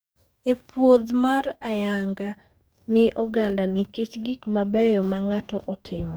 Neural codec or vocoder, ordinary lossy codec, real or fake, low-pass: codec, 44.1 kHz, 2.6 kbps, DAC; none; fake; none